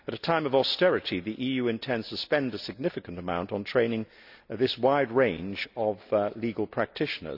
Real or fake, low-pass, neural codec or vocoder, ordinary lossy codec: real; 5.4 kHz; none; none